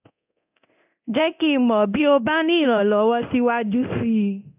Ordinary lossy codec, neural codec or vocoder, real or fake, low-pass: AAC, 32 kbps; codec, 24 kHz, 0.9 kbps, DualCodec; fake; 3.6 kHz